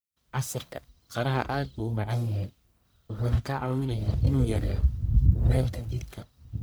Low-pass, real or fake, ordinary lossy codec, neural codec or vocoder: none; fake; none; codec, 44.1 kHz, 1.7 kbps, Pupu-Codec